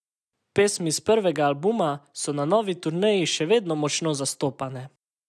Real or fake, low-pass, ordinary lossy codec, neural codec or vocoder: real; none; none; none